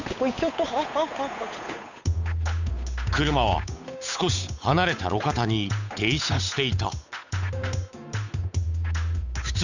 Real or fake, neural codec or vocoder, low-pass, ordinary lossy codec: fake; codec, 16 kHz, 8 kbps, FunCodec, trained on Chinese and English, 25 frames a second; 7.2 kHz; none